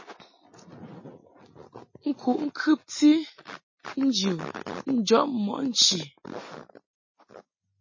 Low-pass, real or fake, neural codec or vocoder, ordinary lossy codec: 7.2 kHz; real; none; MP3, 32 kbps